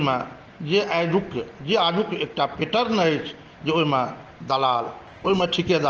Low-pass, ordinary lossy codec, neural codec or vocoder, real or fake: 7.2 kHz; Opus, 16 kbps; none; real